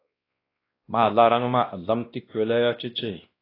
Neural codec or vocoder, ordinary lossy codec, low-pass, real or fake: codec, 16 kHz, 1 kbps, X-Codec, WavLM features, trained on Multilingual LibriSpeech; AAC, 24 kbps; 5.4 kHz; fake